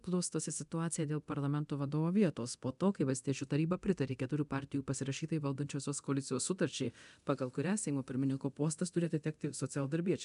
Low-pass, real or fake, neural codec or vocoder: 10.8 kHz; fake; codec, 24 kHz, 0.9 kbps, DualCodec